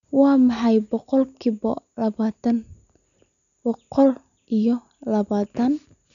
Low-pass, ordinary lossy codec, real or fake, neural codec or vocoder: 7.2 kHz; none; real; none